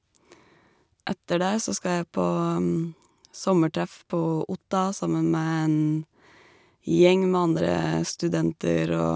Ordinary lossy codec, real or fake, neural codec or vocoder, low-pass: none; real; none; none